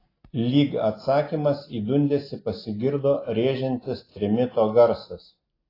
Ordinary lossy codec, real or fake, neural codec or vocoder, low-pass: AAC, 24 kbps; real; none; 5.4 kHz